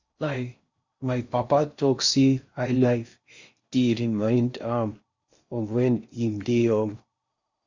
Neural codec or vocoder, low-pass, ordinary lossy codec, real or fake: codec, 16 kHz in and 24 kHz out, 0.6 kbps, FocalCodec, streaming, 4096 codes; 7.2 kHz; Opus, 64 kbps; fake